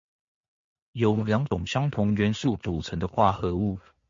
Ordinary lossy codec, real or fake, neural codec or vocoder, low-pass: MP3, 48 kbps; real; none; 7.2 kHz